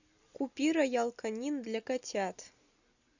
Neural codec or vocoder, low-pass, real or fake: none; 7.2 kHz; real